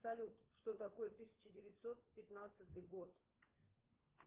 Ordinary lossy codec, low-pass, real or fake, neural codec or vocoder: Opus, 16 kbps; 3.6 kHz; fake; codec, 16 kHz, 4 kbps, FunCodec, trained on LibriTTS, 50 frames a second